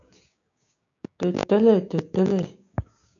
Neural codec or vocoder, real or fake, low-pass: codec, 16 kHz, 6 kbps, DAC; fake; 7.2 kHz